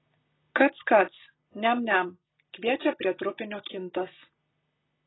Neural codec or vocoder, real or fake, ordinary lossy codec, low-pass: none; real; AAC, 16 kbps; 7.2 kHz